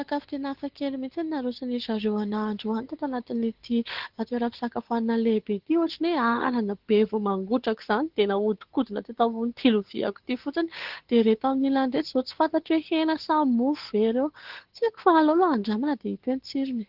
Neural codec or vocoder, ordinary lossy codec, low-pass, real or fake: codec, 16 kHz, 8 kbps, FunCodec, trained on Chinese and English, 25 frames a second; Opus, 16 kbps; 5.4 kHz; fake